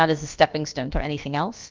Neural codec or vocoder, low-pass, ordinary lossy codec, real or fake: codec, 16 kHz, about 1 kbps, DyCAST, with the encoder's durations; 7.2 kHz; Opus, 24 kbps; fake